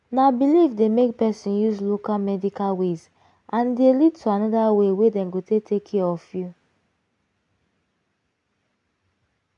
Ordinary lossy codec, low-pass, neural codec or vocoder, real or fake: none; 10.8 kHz; none; real